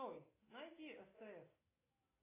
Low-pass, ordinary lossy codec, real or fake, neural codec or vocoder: 3.6 kHz; AAC, 24 kbps; real; none